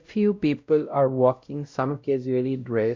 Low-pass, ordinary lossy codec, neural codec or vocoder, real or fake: 7.2 kHz; MP3, 64 kbps; codec, 16 kHz, 0.5 kbps, X-Codec, WavLM features, trained on Multilingual LibriSpeech; fake